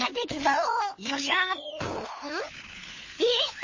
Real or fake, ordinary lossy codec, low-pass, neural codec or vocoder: fake; MP3, 32 kbps; 7.2 kHz; codec, 16 kHz, 4 kbps, FunCodec, trained on LibriTTS, 50 frames a second